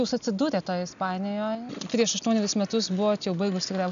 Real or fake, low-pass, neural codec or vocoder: real; 7.2 kHz; none